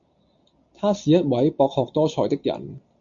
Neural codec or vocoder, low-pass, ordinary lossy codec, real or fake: none; 7.2 kHz; MP3, 64 kbps; real